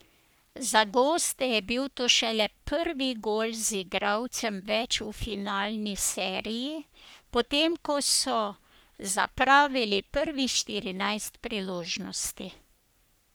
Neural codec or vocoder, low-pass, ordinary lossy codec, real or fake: codec, 44.1 kHz, 3.4 kbps, Pupu-Codec; none; none; fake